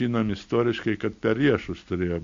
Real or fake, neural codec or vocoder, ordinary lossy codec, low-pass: real; none; MP3, 48 kbps; 7.2 kHz